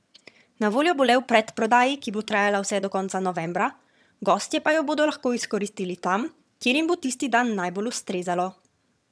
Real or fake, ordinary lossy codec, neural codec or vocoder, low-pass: fake; none; vocoder, 22.05 kHz, 80 mel bands, HiFi-GAN; none